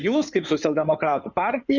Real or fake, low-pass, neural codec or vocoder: fake; 7.2 kHz; codec, 16 kHz in and 24 kHz out, 2.2 kbps, FireRedTTS-2 codec